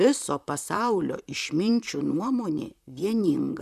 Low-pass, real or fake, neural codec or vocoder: 14.4 kHz; fake; vocoder, 44.1 kHz, 128 mel bands, Pupu-Vocoder